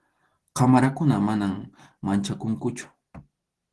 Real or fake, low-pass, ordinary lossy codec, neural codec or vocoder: real; 10.8 kHz; Opus, 16 kbps; none